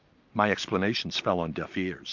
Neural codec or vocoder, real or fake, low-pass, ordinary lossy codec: none; real; 7.2 kHz; MP3, 64 kbps